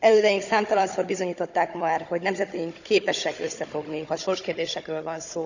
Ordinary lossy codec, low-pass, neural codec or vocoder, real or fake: none; 7.2 kHz; codec, 16 kHz, 16 kbps, FunCodec, trained on Chinese and English, 50 frames a second; fake